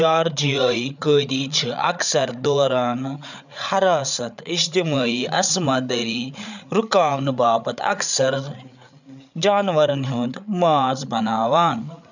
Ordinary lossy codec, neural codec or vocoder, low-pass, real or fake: none; codec, 16 kHz, 8 kbps, FreqCodec, larger model; 7.2 kHz; fake